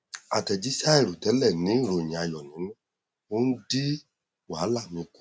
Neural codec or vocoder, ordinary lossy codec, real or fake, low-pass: none; none; real; none